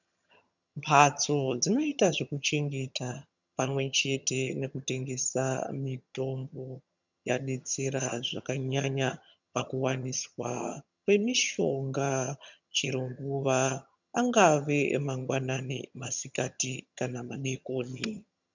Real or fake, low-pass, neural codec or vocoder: fake; 7.2 kHz; vocoder, 22.05 kHz, 80 mel bands, HiFi-GAN